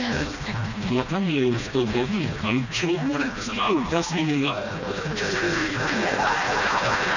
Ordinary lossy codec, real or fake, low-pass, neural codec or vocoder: none; fake; 7.2 kHz; codec, 16 kHz, 1 kbps, FreqCodec, smaller model